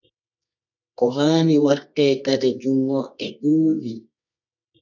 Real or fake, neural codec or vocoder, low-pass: fake; codec, 24 kHz, 0.9 kbps, WavTokenizer, medium music audio release; 7.2 kHz